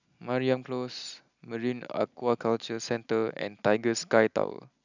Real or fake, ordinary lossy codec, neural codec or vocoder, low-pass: real; none; none; 7.2 kHz